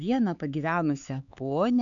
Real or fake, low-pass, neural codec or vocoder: fake; 7.2 kHz; codec, 16 kHz, 4 kbps, X-Codec, HuBERT features, trained on LibriSpeech